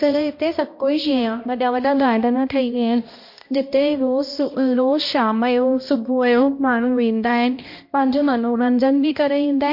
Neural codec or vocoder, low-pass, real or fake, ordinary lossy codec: codec, 16 kHz, 1 kbps, X-Codec, HuBERT features, trained on balanced general audio; 5.4 kHz; fake; MP3, 32 kbps